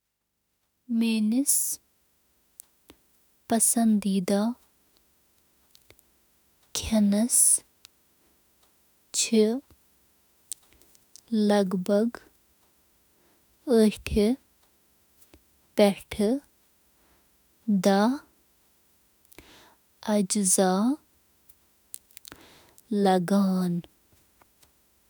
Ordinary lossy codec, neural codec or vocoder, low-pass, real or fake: none; autoencoder, 48 kHz, 32 numbers a frame, DAC-VAE, trained on Japanese speech; none; fake